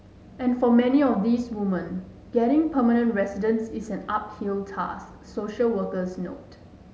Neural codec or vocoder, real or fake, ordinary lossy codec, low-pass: none; real; none; none